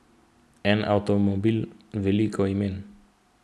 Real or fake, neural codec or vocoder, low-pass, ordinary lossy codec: real; none; none; none